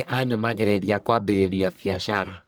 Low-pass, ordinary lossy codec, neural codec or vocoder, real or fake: none; none; codec, 44.1 kHz, 1.7 kbps, Pupu-Codec; fake